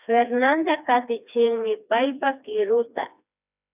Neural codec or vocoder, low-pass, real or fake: codec, 16 kHz, 2 kbps, FreqCodec, smaller model; 3.6 kHz; fake